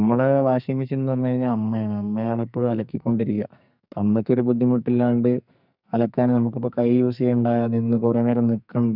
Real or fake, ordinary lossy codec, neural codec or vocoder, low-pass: fake; none; codec, 44.1 kHz, 2.6 kbps, SNAC; 5.4 kHz